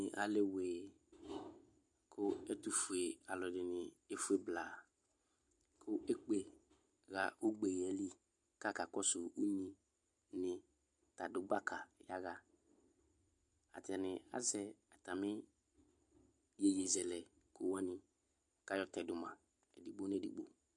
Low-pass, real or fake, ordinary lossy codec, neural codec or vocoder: 10.8 kHz; real; MP3, 64 kbps; none